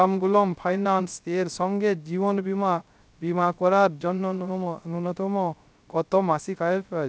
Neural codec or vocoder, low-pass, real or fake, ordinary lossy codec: codec, 16 kHz, 0.3 kbps, FocalCodec; none; fake; none